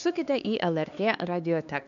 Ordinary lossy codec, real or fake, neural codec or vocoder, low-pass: AAC, 64 kbps; fake; codec, 16 kHz, 2 kbps, X-Codec, HuBERT features, trained on LibriSpeech; 7.2 kHz